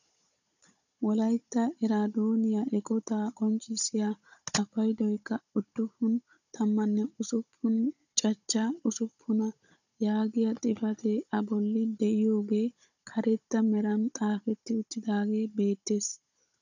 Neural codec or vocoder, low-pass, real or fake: codec, 16 kHz, 16 kbps, FunCodec, trained on Chinese and English, 50 frames a second; 7.2 kHz; fake